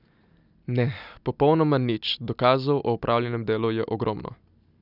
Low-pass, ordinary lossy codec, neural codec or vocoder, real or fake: 5.4 kHz; none; none; real